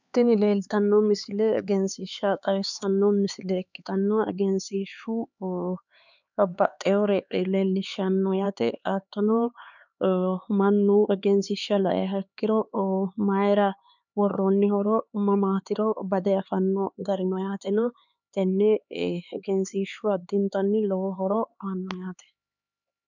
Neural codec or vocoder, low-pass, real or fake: codec, 16 kHz, 4 kbps, X-Codec, HuBERT features, trained on LibriSpeech; 7.2 kHz; fake